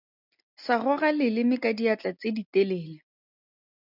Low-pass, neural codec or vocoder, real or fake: 5.4 kHz; none; real